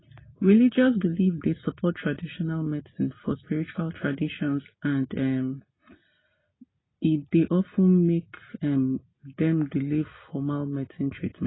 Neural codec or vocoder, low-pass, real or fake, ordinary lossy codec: none; 7.2 kHz; real; AAC, 16 kbps